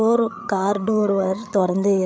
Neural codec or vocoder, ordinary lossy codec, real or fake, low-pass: codec, 16 kHz, 8 kbps, FreqCodec, larger model; none; fake; none